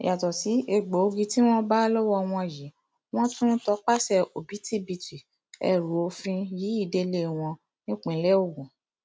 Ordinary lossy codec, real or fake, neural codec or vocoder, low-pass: none; real; none; none